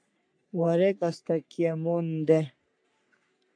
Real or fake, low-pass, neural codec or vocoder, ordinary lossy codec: fake; 9.9 kHz; codec, 44.1 kHz, 3.4 kbps, Pupu-Codec; AAC, 64 kbps